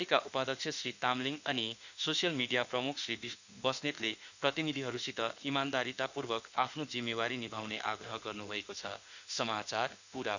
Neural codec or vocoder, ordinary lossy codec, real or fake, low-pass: autoencoder, 48 kHz, 32 numbers a frame, DAC-VAE, trained on Japanese speech; none; fake; 7.2 kHz